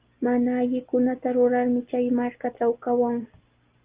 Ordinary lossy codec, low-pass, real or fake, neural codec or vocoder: Opus, 32 kbps; 3.6 kHz; real; none